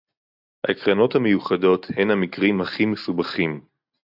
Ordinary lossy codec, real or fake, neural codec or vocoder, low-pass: AAC, 48 kbps; real; none; 5.4 kHz